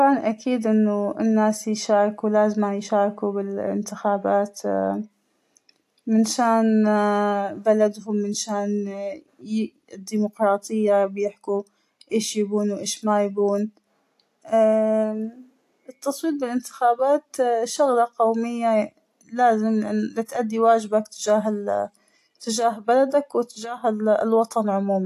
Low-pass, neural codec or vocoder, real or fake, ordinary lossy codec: 14.4 kHz; none; real; AAC, 64 kbps